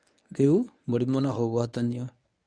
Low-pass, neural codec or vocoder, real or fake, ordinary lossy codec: 9.9 kHz; codec, 24 kHz, 0.9 kbps, WavTokenizer, medium speech release version 1; fake; none